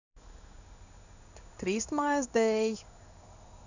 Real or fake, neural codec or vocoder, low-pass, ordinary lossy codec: fake; codec, 16 kHz in and 24 kHz out, 1 kbps, XY-Tokenizer; 7.2 kHz; none